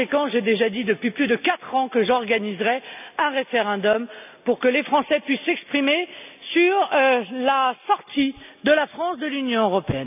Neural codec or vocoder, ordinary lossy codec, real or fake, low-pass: none; none; real; 3.6 kHz